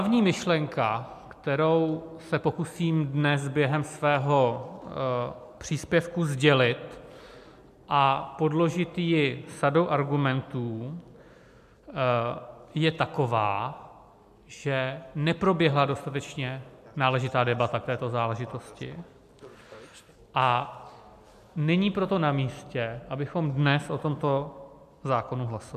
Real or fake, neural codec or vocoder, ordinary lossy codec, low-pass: real; none; MP3, 96 kbps; 14.4 kHz